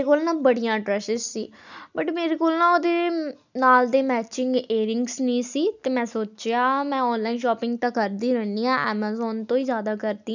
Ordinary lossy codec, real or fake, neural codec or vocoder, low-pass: none; real; none; 7.2 kHz